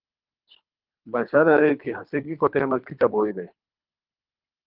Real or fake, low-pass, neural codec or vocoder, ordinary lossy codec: fake; 5.4 kHz; codec, 24 kHz, 3 kbps, HILCodec; Opus, 32 kbps